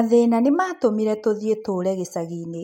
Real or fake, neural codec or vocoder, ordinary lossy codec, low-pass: real; none; MP3, 64 kbps; 19.8 kHz